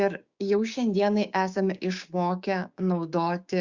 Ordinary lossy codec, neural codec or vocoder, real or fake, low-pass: Opus, 64 kbps; codec, 16 kHz, 6 kbps, DAC; fake; 7.2 kHz